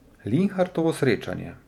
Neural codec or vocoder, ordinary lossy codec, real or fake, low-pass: vocoder, 48 kHz, 128 mel bands, Vocos; none; fake; 19.8 kHz